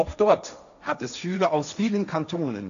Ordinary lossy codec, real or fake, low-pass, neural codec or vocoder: AAC, 96 kbps; fake; 7.2 kHz; codec, 16 kHz, 1.1 kbps, Voila-Tokenizer